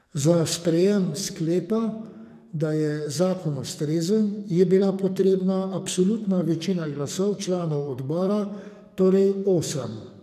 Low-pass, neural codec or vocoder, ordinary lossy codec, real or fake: 14.4 kHz; codec, 44.1 kHz, 2.6 kbps, SNAC; none; fake